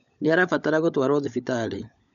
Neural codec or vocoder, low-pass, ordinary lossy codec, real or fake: codec, 16 kHz, 16 kbps, FunCodec, trained on LibriTTS, 50 frames a second; 7.2 kHz; none; fake